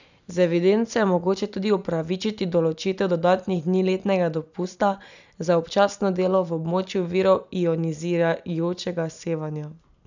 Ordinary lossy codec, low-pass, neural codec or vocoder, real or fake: none; 7.2 kHz; none; real